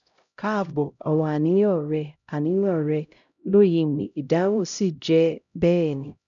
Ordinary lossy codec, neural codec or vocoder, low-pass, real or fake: none; codec, 16 kHz, 0.5 kbps, X-Codec, HuBERT features, trained on LibriSpeech; 7.2 kHz; fake